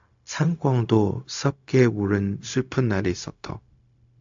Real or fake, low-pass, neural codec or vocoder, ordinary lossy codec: fake; 7.2 kHz; codec, 16 kHz, 0.4 kbps, LongCat-Audio-Codec; AAC, 64 kbps